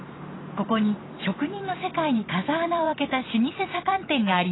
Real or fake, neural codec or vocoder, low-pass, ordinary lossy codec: fake; vocoder, 44.1 kHz, 128 mel bands every 256 samples, BigVGAN v2; 7.2 kHz; AAC, 16 kbps